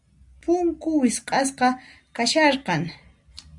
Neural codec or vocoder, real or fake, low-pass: none; real; 10.8 kHz